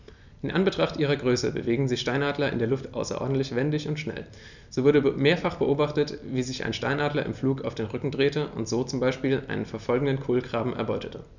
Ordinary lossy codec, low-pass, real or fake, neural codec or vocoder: none; 7.2 kHz; fake; vocoder, 44.1 kHz, 128 mel bands every 256 samples, BigVGAN v2